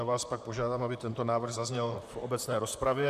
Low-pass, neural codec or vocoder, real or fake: 14.4 kHz; vocoder, 44.1 kHz, 128 mel bands, Pupu-Vocoder; fake